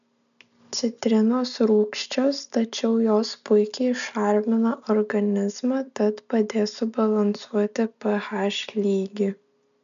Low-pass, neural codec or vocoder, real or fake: 7.2 kHz; none; real